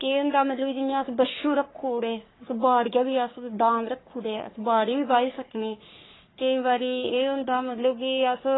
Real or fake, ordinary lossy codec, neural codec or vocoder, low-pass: fake; AAC, 16 kbps; codec, 44.1 kHz, 3.4 kbps, Pupu-Codec; 7.2 kHz